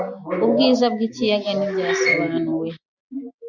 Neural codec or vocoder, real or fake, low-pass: none; real; 7.2 kHz